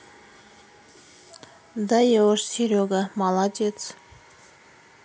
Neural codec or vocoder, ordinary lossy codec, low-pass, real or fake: none; none; none; real